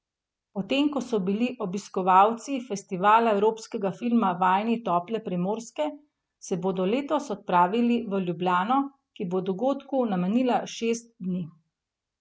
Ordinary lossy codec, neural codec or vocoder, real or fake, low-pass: none; none; real; none